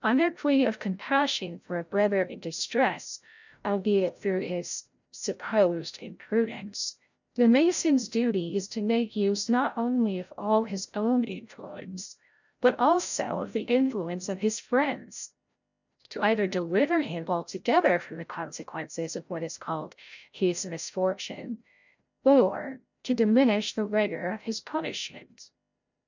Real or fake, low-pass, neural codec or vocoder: fake; 7.2 kHz; codec, 16 kHz, 0.5 kbps, FreqCodec, larger model